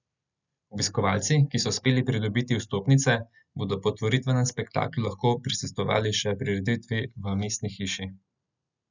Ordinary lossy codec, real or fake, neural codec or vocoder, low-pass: none; real; none; 7.2 kHz